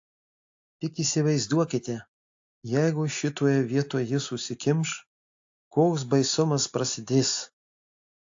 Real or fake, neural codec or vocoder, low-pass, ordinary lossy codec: real; none; 7.2 kHz; AAC, 48 kbps